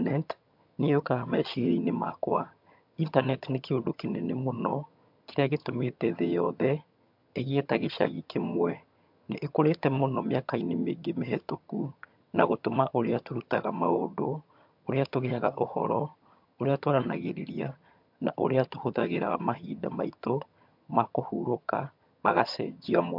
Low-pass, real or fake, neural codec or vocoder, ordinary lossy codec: 5.4 kHz; fake; vocoder, 22.05 kHz, 80 mel bands, HiFi-GAN; MP3, 48 kbps